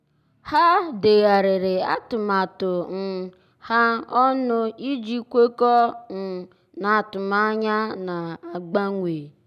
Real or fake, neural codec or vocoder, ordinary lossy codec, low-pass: real; none; none; 14.4 kHz